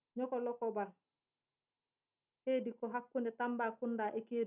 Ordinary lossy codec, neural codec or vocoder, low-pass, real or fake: none; none; 3.6 kHz; real